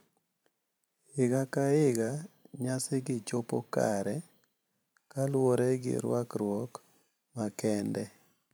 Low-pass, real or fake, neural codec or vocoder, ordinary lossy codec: none; fake; vocoder, 44.1 kHz, 128 mel bands every 512 samples, BigVGAN v2; none